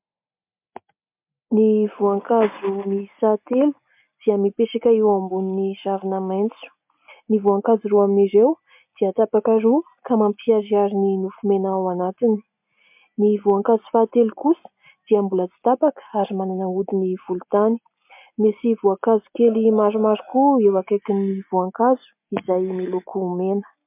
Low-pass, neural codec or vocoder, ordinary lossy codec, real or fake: 3.6 kHz; none; MP3, 32 kbps; real